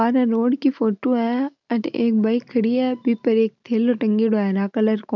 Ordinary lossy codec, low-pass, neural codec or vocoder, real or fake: none; 7.2 kHz; none; real